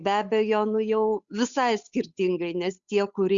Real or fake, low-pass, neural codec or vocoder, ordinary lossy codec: fake; 7.2 kHz; codec, 16 kHz, 8 kbps, FunCodec, trained on Chinese and English, 25 frames a second; Opus, 64 kbps